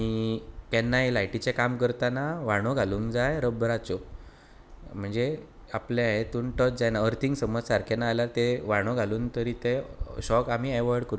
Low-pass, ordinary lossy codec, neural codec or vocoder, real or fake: none; none; none; real